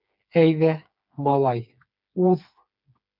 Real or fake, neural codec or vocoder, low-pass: fake; codec, 16 kHz, 4 kbps, FreqCodec, smaller model; 5.4 kHz